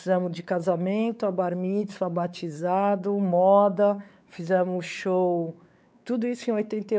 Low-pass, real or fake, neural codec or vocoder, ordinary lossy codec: none; fake; codec, 16 kHz, 4 kbps, X-Codec, WavLM features, trained on Multilingual LibriSpeech; none